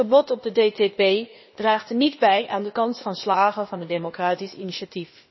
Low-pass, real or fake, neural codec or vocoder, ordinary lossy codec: 7.2 kHz; fake; codec, 16 kHz, 0.8 kbps, ZipCodec; MP3, 24 kbps